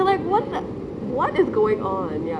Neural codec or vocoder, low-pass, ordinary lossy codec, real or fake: none; none; none; real